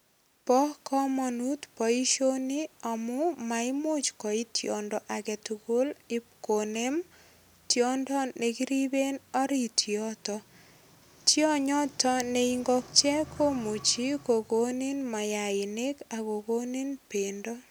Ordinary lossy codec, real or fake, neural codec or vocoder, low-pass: none; real; none; none